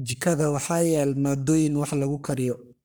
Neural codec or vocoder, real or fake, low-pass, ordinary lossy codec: codec, 44.1 kHz, 3.4 kbps, Pupu-Codec; fake; none; none